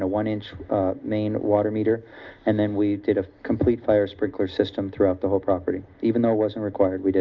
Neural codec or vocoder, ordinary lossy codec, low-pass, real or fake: none; Opus, 24 kbps; 7.2 kHz; real